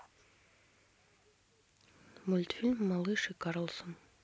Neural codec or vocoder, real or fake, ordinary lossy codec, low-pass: none; real; none; none